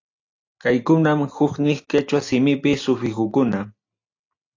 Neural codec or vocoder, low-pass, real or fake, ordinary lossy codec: none; 7.2 kHz; real; AAC, 48 kbps